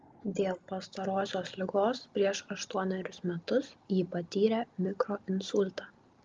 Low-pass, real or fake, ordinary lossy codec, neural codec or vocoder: 7.2 kHz; real; Opus, 32 kbps; none